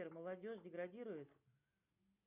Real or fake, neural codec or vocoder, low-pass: real; none; 3.6 kHz